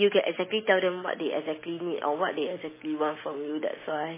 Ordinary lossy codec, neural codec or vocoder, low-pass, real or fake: MP3, 16 kbps; none; 3.6 kHz; real